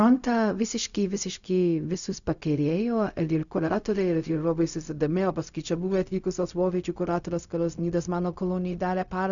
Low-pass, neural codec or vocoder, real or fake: 7.2 kHz; codec, 16 kHz, 0.4 kbps, LongCat-Audio-Codec; fake